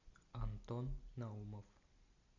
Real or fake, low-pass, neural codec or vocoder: real; 7.2 kHz; none